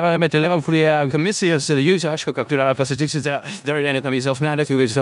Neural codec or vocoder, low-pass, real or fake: codec, 16 kHz in and 24 kHz out, 0.4 kbps, LongCat-Audio-Codec, four codebook decoder; 10.8 kHz; fake